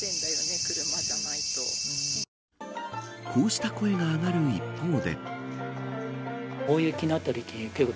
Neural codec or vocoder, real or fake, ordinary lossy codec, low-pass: none; real; none; none